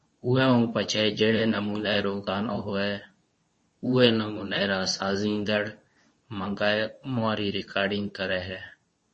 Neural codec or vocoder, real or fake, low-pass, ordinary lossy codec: codec, 24 kHz, 0.9 kbps, WavTokenizer, medium speech release version 2; fake; 10.8 kHz; MP3, 32 kbps